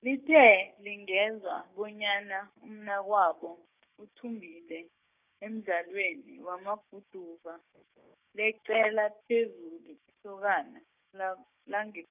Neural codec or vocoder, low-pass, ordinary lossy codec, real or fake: codec, 16 kHz, 6 kbps, DAC; 3.6 kHz; none; fake